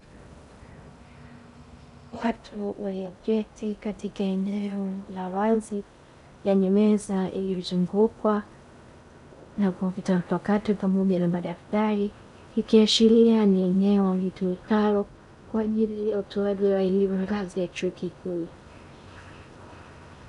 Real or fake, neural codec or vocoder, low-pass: fake; codec, 16 kHz in and 24 kHz out, 0.6 kbps, FocalCodec, streaming, 2048 codes; 10.8 kHz